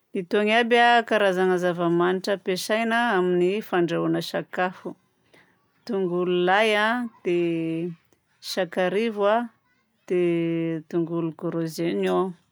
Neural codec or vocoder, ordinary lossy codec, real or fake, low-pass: none; none; real; none